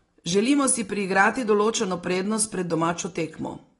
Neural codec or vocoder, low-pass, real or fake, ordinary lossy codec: none; 10.8 kHz; real; AAC, 32 kbps